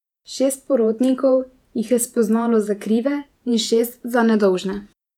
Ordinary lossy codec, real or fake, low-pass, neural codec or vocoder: none; fake; 19.8 kHz; vocoder, 48 kHz, 128 mel bands, Vocos